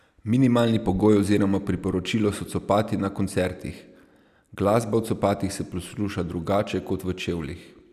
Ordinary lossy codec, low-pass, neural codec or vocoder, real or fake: none; 14.4 kHz; none; real